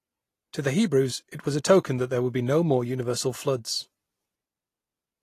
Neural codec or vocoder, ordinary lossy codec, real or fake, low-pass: none; AAC, 48 kbps; real; 14.4 kHz